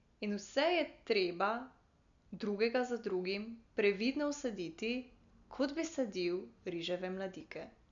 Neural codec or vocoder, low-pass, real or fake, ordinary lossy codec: none; 7.2 kHz; real; MP3, 64 kbps